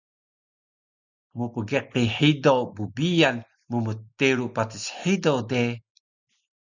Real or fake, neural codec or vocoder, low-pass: real; none; 7.2 kHz